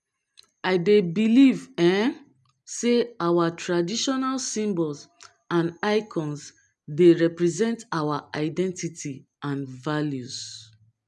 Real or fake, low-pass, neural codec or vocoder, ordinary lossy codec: real; none; none; none